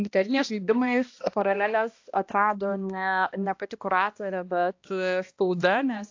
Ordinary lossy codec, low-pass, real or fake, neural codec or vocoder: AAC, 48 kbps; 7.2 kHz; fake; codec, 16 kHz, 1 kbps, X-Codec, HuBERT features, trained on balanced general audio